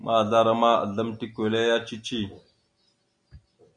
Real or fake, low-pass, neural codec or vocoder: real; 9.9 kHz; none